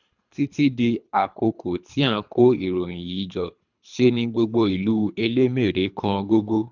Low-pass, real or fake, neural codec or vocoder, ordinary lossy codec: 7.2 kHz; fake; codec, 24 kHz, 3 kbps, HILCodec; none